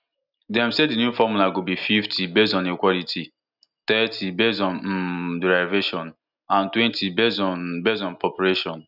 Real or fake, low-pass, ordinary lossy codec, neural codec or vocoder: real; 5.4 kHz; none; none